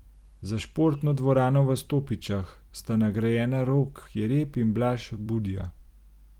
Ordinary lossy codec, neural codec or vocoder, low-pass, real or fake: Opus, 24 kbps; vocoder, 44.1 kHz, 128 mel bands every 256 samples, BigVGAN v2; 19.8 kHz; fake